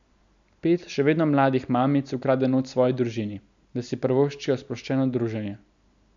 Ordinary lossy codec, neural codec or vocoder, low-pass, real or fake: none; none; 7.2 kHz; real